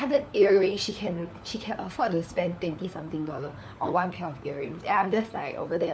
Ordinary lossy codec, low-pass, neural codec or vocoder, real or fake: none; none; codec, 16 kHz, 4 kbps, FunCodec, trained on LibriTTS, 50 frames a second; fake